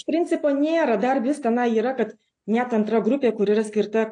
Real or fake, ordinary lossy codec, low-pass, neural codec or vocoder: real; AAC, 48 kbps; 9.9 kHz; none